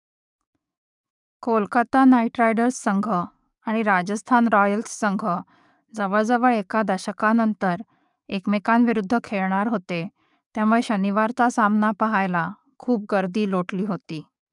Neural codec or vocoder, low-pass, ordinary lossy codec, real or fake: codec, 44.1 kHz, 7.8 kbps, DAC; 10.8 kHz; none; fake